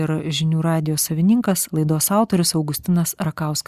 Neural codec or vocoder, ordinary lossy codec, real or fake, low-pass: none; Opus, 64 kbps; real; 14.4 kHz